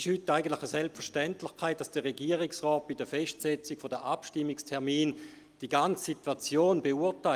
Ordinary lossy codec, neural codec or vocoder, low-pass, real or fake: Opus, 32 kbps; none; 14.4 kHz; real